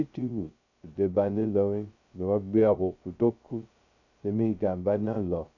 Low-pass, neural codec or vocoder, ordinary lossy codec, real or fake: 7.2 kHz; codec, 16 kHz, 0.2 kbps, FocalCodec; none; fake